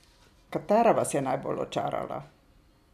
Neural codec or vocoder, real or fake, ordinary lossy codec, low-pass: none; real; none; 14.4 kHz